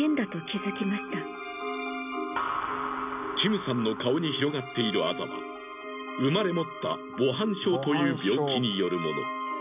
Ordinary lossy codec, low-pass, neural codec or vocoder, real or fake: none; 3.6 kHz; none; real